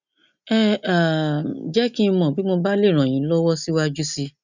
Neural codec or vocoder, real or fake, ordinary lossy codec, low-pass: none; real; none; 7.2 kHz